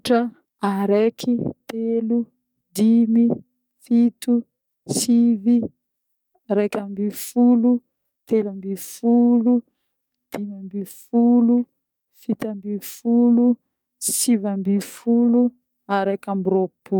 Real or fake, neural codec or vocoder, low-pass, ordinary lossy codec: fake; codec, 44.1 kHz, 7.8 kbps, DAC; 19.8 kHz; none